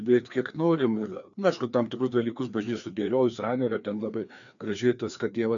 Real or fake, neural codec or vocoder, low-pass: fake; codec, 16 kHz, 2 kbps, FreqCodec, larger model; 7.2 kHz